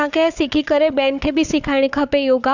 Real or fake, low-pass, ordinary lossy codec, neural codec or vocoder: fake; 7.2 kHz; none; codec, 16 kHz, 4 kbps, FunCodec, trained on Chinese and English, 50 frames a second